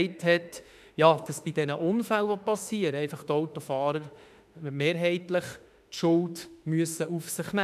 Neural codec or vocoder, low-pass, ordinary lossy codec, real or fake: autoencoder, 48 kHz, 32 numbers a frame, DAC-VAE, trained on Japanese speech; 14.4 kHz; none; fake